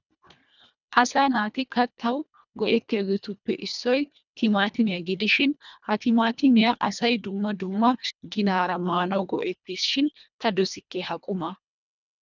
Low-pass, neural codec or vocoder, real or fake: 7.2 kHz; codec, 24 kHz, 1.5 kbps, HILCodec; fake